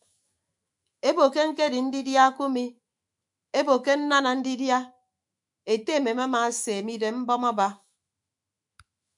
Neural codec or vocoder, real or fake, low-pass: autoencoder, 48 kHz, 128 numbers a frame, DAC-VAE, trained on Japanese speech; fake; 10.8 kHz